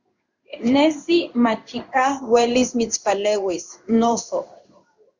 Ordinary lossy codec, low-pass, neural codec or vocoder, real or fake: Opus, 64 kbps; 7.2 kHz; codec, 16 kHz in and 24 kHz out, 1 kbps, XY-Tokenizer; fake